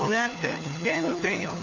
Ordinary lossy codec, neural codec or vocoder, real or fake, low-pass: none; codec, 16 kHz, 4 kbps, FunCodec, trained on LibriTTS, 50 frames a second; fake; 7.2 kHz